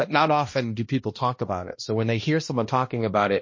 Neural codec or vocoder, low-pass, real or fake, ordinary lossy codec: codec, 16 kHz, 1 kbps, X-Codec, HuBERT features, trained on balanced general audio; 7.2 kHz; fake; MP3, 32 kbps